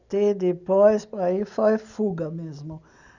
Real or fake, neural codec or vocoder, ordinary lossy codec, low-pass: real; none; none; 7.2 kHz